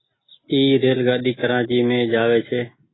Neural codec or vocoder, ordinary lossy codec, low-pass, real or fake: none; AAC, 16 kbps; 7.2 kHz; real